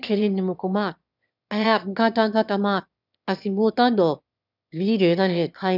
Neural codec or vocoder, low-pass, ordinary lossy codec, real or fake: autoencoder, 22.05 kHz, a latent of 192 numbers a frame, VITS, trained on one speaker; 5.4 kHz; none; fake